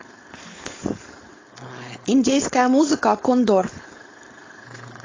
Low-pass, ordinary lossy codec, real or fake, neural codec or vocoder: 7.2 kHz; AAC, 32 kbps; fake; codec, 16 kHz, 4.8 kbps, FACodec